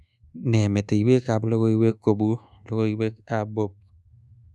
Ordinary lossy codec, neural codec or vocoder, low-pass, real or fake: none; codec, 24 kHz, 1.2 kbps, DualCodec; none; fake